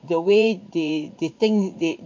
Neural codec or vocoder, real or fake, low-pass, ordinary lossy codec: vocoder, 44.1 kHz, 80 mel bands, Vocos; fake; 7.2 kHz; MP3, 64 kbps